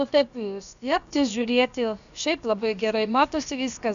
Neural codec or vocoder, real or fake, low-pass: codec, 16 kHz, about 1 kbps, DyCAST, with the encoder's durations; fake; 7.2 kHz